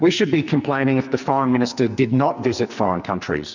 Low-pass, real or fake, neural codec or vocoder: 7.2 kHz; fake; codec, 44.1 kHz, 2.6 kbps, SNAC